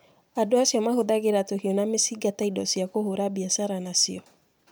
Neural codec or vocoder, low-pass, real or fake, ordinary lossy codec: none; none; real; none